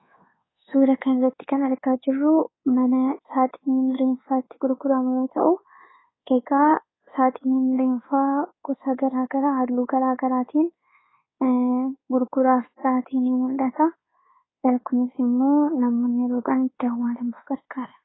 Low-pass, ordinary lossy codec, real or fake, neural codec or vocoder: 7.2 kHz; AAC, 16 kbps; fake; codec, 24 kHz, 1.2 kbps, DualCodec